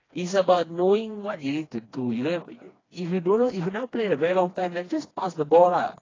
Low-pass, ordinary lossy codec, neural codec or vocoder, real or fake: 7.2 kHz; AAC, 32 kbps; codec, 16 kHz, 2 kbps, FreqCodec, smaller model; fake